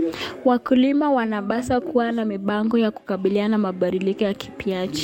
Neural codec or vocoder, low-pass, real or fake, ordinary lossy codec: codec, 44.1 kHz, 7.8 kbps, DAC; 19.8 kHz; fake; MP3, 64 kbps